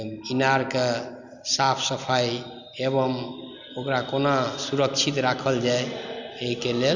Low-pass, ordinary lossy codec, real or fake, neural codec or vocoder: 7.2 kHz; none; real; none